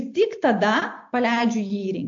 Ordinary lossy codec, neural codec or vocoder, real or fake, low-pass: AAC, 48 kbps; none; real; 7.2 kHz